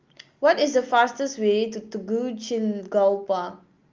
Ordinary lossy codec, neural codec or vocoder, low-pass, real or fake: Opus, 32 kbps; none; 7.2 kHz; real